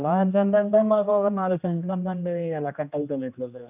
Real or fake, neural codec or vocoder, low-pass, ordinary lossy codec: fake; codec, 16 kHz, 1 kbps, X-Codec, HuBERT features, trained on general audio; 3.6 kHz; none